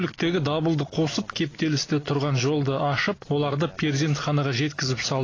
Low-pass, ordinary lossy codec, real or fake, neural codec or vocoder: 7.2 kHz; AAC, 32 kbps; real; none